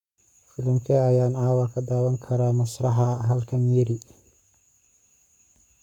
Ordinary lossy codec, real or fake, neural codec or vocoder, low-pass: none; fake; codec, 44.1 kHz, 7.8 kbps, Pupu-Codec; 19.8 kHz